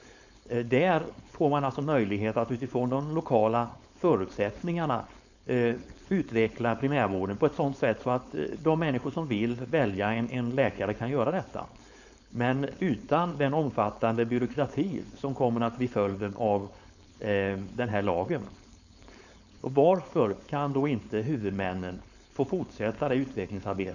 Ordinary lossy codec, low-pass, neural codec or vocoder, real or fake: none; 7.2 kHz; codec, 16 kHz, 4.8 kbps, FACodec; fake